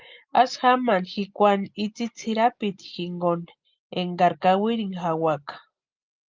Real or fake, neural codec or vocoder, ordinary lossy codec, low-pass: real; none; Opus, 24 kbps; 7.2 kHz